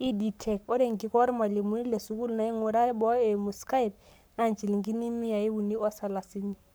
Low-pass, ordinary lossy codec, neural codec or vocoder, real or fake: none; none; codec, 44.1 kHz, 7.8 kbps, Pupu-Codec; fake